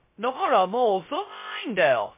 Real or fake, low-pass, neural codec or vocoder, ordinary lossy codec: fake; 3.6 kHz; codec, 16 kHz, 0.2 kbps, FocalCodec; MP3, 24 kbps